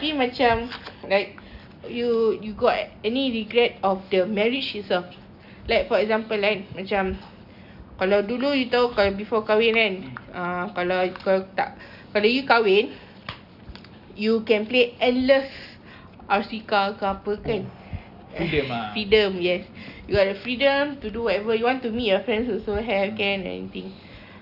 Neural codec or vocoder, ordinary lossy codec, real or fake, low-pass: none; none; real; 5.4 kHz